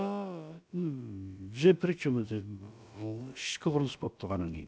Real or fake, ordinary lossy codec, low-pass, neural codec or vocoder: fake; none; none; codec, 16 kHz, about 1 kbps, DyCAST, with the encoder's durations